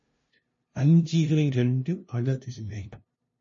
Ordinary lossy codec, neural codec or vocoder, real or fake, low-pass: MP3, 32 kbps; codec, 16 kHz, 0.5 kbps, FunCodec, trained on LibriTTS, 25 frames a second; fake; 7.2 kHz